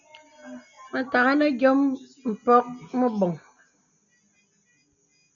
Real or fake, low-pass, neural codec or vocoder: real; 7.2 kHz; none